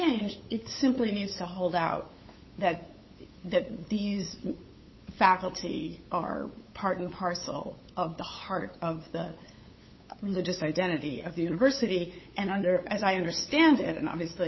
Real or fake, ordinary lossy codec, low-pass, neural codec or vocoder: fake; MP3, 24 kbps; 7.2 kHz; codec, 16 kHz, 8 kbps, FunCodec, trained on LibriTTS, 25 frames a second